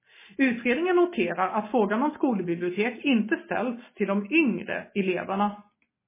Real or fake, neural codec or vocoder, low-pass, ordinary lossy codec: real; none; 3.6 kHz; MP3, 16 kbps